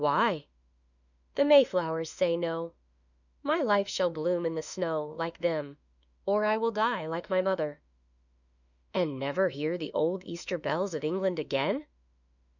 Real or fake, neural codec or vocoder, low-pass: fake; autoencoder, 48 kHz, 32 numbers a frame, DAC-VAE, trained on Japanese speech; 7.2 kHz